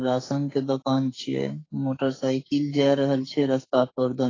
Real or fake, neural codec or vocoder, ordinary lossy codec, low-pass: fake; codec, 44.1 kHz, 2.6 kbps, SNAC; AAC, 32 kbps; 7.2 kHz